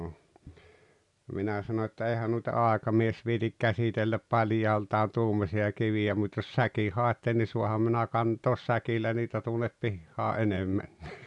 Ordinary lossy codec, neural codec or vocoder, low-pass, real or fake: none; none; 10.8 kHz; real